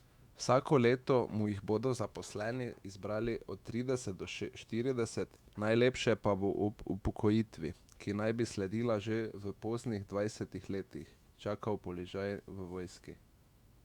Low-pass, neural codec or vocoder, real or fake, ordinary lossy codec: 19.8 kHz; autoencoder, 48 kHz, 128 numbers a frame, DAC-VAE, trained on Japanese speech; fake; Opus, 64 kbps